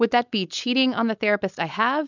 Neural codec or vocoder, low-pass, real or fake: codec, 16 kHz, 4.8 kbps, FACodec; 7.2 kHz; fake